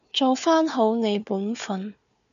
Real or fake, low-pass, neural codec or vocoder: fake; 7.2 kHz; codec, 16 kHz, 4 kbps, FunCodec, trained on Chinese and English, 50 frames a second